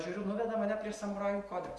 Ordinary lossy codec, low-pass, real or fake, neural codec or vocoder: Opus, 32 kbps; 10.8 kHz; real; none